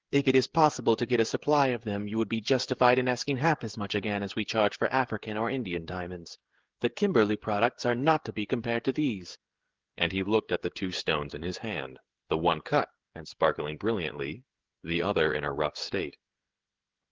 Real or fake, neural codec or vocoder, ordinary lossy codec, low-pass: fake; codec, 16 kHz, 16 kbps, FreqCodec, smaller model; Opus, 16 kbps; 7.2 kHz